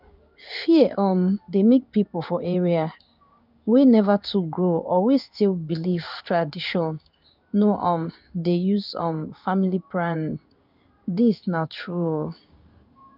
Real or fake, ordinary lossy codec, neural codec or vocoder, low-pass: fake; none; codec, 16 kHz in and 24 kHz out, 1 kbps, XY-Tokenizer; 5.4 kHz